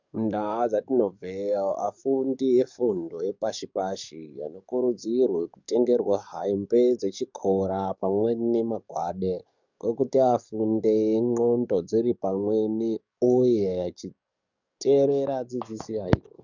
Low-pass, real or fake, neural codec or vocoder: 7.2 kHz; fake; codec, 44.1 kHz, 7.8 kbps, DAC